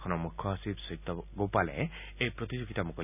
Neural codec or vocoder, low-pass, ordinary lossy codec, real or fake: none; 3.6 kHz; none; real